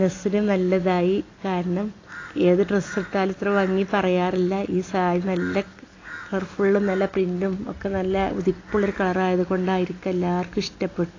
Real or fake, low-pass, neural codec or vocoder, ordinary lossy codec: real; 7.2 kHz; none; AAC, 32 kbps